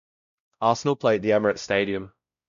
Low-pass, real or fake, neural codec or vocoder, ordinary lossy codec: 7.2 kHz; fake; codec, 16 kHz, 0.5 kbps, X-Codec, WavLM features, trained on Multilingual LibriSpeech; none